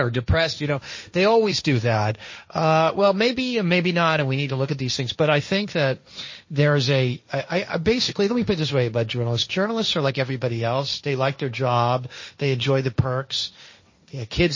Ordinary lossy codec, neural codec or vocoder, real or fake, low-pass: MP3, 32 kbps; codec, 16 kHz, 1.1 kbps, Voila-Tokenizer; fake; 7.2 kHz